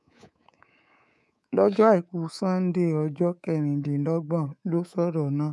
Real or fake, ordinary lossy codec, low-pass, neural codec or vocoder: fake; none; none; codec, 24 kHz, 3.1 kbps, DualCodec